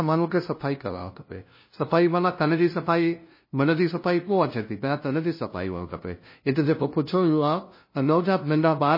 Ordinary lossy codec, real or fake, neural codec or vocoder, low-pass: MP3, 24 kbps; fake; codec, 16 kHz, 0.5 kbps, FunCodec, trained on LibriTTS, 25 frames a second; 5.4 kHz